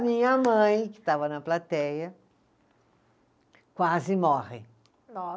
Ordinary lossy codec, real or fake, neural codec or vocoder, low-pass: none; real; none; none